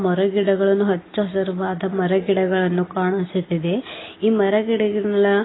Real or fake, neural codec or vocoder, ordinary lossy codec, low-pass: real; none; AAC, 16 kbps; 7.2 kHz